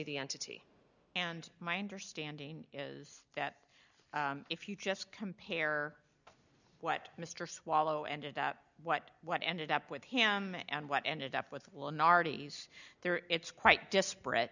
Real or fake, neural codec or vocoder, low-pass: real; none; 7.2 kHz